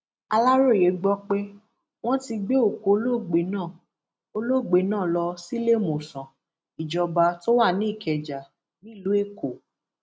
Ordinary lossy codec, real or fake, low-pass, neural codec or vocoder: none; real; none; none